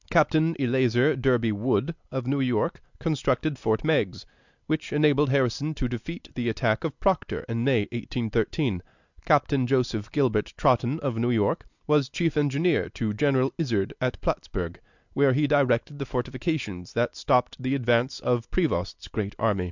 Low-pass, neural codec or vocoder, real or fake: 7.2 kHz; none; real